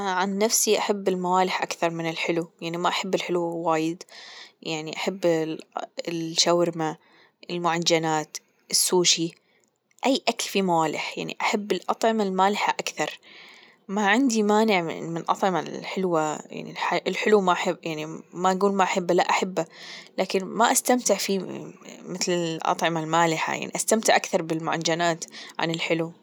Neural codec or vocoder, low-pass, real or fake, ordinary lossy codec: none; none; real; none